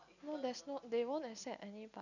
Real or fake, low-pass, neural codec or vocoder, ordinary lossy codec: real; 7.2 kHz; none; none